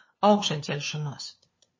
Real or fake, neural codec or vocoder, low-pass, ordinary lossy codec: fake; codec, 16 kHz, 2 kbps, FunCodec, trained on LibriTTS, 25 frames a second; 7.2 kHz; MP3, 32 kbps